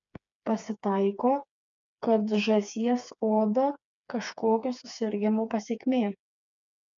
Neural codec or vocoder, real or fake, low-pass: codec, 16 kHz, 4 kbps, FreqCodec, smaller model; fake; 7.2 kHz